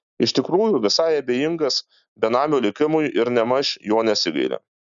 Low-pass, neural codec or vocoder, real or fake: 7.2 kHz; none; real